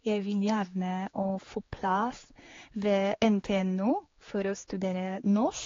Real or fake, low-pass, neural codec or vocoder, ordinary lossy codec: fake; 7.2 kHz; codec, 16 kHz, 4 kbps, X-Codec, HuBERT features, trained on general audio; AAC, 32 kbps